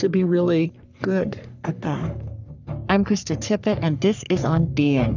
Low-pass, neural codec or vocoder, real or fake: 7.2 kHz; codec, 44.1 kHz, 3.4 kbps, Pupu-Codec; fake